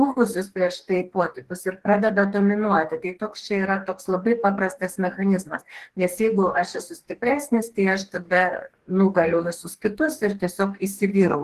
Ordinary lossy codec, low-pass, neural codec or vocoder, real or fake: Opus, 16 kbps; 14.4 kHz; codec, 44.1 kHz, 2.6 kbps, DAC; fake